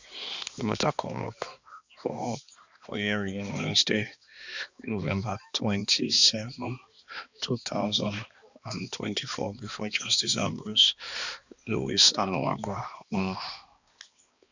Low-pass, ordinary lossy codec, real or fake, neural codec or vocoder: 7.2 kHz; Opus, 64 kbps; fake; codec, 16 kHz, 2 kbps, X-Codec, HuBERT features, trained on balanced general audio